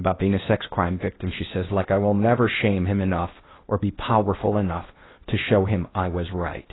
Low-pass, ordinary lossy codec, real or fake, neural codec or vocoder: 7.2 kHz; AAC, 16 kbps; fake; codec, 16 kHz in and 24 kHz out, 0.8 kbps, FocalCodec, streaming, 65536 codes